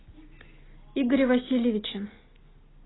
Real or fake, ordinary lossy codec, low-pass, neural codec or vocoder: real; AAC, 16 kbps; 7.2 kHz; none